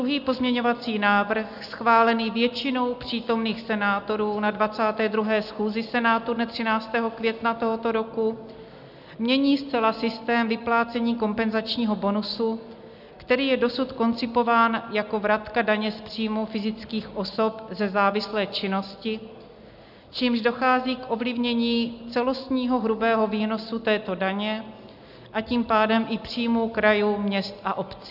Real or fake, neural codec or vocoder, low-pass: real; none; 5.4 kHz